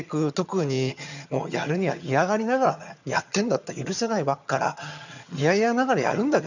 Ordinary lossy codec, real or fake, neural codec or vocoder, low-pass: none; fake; vocoder, 22.05 kHz, 80 mel bands, HiFi-GAN; 7.2 kHz